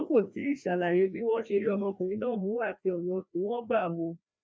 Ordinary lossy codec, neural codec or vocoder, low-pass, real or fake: none; codec, 16 kHz, 1 kbps, FreqCodec, larger model; none; fake